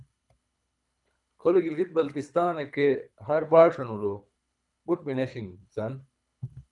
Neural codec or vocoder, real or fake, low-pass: codec, 24 kHz, 3 kbps, HILCodec; fake; 10.8 kHz